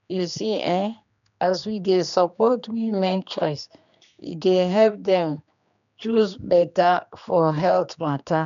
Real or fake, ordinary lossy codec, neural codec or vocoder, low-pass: fake; none; codec, 16 kHz, 2 kbps, X-Codec, HuBERT features, trained on general audio; 7.2 kHz